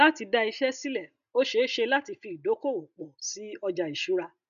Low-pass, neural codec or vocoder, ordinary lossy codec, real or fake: 7.2 kHz; none; none; real